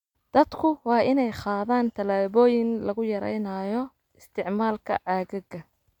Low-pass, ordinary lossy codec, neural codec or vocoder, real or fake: 19.8 kHz; MP3, 96 kbps; none; real